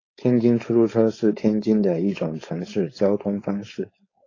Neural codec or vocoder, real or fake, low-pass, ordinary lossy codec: codec, 16 kHz, 4.8 kbps, FACodec; fake; 7.2 kHz; AAC, 32 kbps